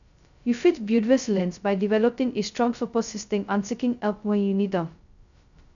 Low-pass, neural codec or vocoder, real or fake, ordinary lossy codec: 7.2 kHz; codec, 16 kHz, 0.2 kbps, FocalCodec; fake; none